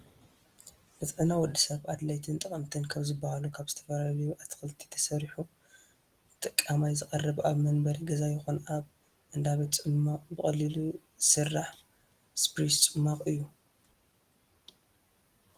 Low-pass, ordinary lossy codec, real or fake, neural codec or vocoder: 14.4 kHz; Opus, 24 kbps; real; none